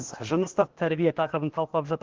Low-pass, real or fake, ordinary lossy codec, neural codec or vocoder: 7.2 kHz; fake; Opus, 16 kbps; codec, 16 kHz, 0.8 kbps, ZipCodec